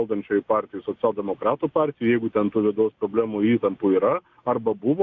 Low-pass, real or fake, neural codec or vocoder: 7.2 kHz; real; none